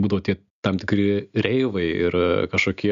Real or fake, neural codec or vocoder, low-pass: real; none; 7.2 kHz